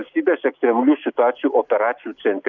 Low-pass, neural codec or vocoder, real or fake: 7.2 kHz; none; real